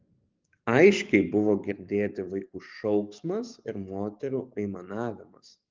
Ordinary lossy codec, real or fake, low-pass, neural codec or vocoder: Opus, 32 kbps; fake; 7.2 kHz; codec, 44.1 kHz, 7.8 kbps, DAC